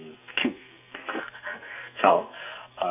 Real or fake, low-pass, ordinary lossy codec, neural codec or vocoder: fake; 3.6 kHz; none; codec, 44.1 kHz, 2.6 kbps, SNAC